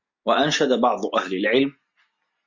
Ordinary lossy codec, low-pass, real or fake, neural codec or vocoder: MP3, 48 kbps; 7.2 kHz; real; none